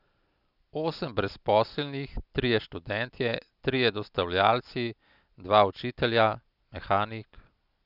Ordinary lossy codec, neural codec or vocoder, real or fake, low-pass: none; none; real; 5.4 kHz